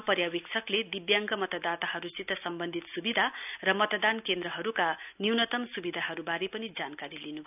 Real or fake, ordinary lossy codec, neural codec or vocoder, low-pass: real; none; none; 3.6 kHz